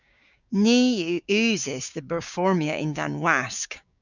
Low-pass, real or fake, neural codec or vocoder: 7.2 kHz; fake; codec, 16 kHz, 6 kbps, DAC